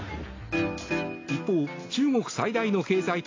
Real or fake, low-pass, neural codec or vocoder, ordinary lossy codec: real; 7.2 kHz; none; AAC, 32 kbps